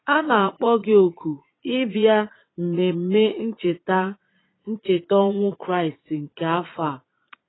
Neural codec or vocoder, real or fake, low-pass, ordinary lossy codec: vocoder, 22.05 kHz, 80 mel bands, Vocos; fake; 7.2 kHz; AAC, 16 kbps